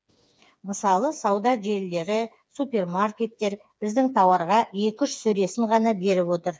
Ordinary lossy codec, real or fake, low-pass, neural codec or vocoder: none; fake; none; codec, 16 kHz, 4 kbps, FreqCodec, smaller model